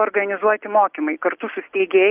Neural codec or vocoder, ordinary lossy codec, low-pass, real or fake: none; Opus, 24 kbps; 3.6 kHz; real